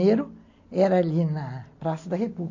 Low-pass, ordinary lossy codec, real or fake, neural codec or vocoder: 7.2 kHz; MP3, 48 kbps; real; none